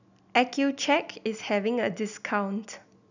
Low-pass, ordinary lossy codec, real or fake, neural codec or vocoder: 7.2 kHz; none; real; none